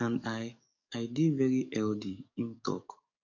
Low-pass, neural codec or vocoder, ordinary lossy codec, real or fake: 7.2 kHz; codec, 44.1 kHz, 7.8 kbps, DAC; none; fake